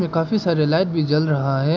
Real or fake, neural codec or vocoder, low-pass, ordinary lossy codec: real; none; 7.2 kHz; none